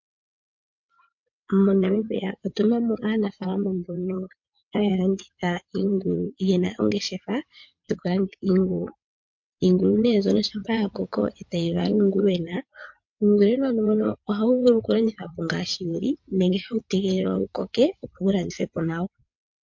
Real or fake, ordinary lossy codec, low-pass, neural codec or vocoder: fake; MP3, 48 kbps; 7.2 kHz; vocoder, 22.05 kHz, 80 mel bands, WaveNeXt